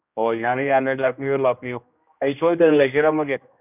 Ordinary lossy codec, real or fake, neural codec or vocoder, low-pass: none; fake; codec, 16 kHz, 1 kbps, X-Codec, HuBERT features, trained on general audio; 3.6 kHz